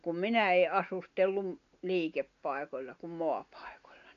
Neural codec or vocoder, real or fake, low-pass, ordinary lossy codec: none; real; 7.2 kHz; none